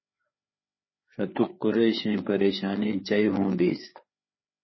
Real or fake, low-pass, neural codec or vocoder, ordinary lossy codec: fake; 7.2 kHz; codec, 16 kHz, 4 kbps, FreqCodec, larger model; MP3, 24 kbps